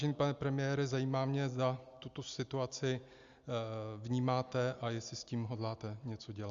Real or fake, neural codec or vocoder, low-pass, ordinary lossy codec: real; none; 7.2 kHz; Opus, 64 kbps